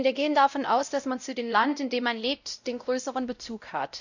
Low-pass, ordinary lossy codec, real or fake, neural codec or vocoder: 7.2 kHz; none; fake; codec, 16 kHz, 0.5 kbps, X-Codec, WavLM features, trained on Multilingual LibriSpeech